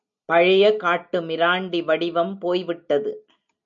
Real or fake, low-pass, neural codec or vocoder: real; 7.2 kHz; none